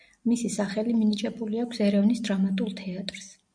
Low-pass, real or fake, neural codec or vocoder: 9.9 kHz; real; none